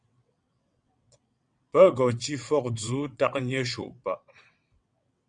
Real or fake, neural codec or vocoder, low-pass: fake; vocoder, 22.05 kHz, 80 mel bands, WaveNeXt; 9.9 kHz